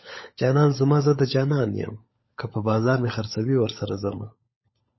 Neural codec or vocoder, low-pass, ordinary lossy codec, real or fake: codec, 16 kHz, 16 kbps, FunCodec, trained on LibriTTS, 50 frames a second; 7.2 kHz; MP3, 24 kbps; fake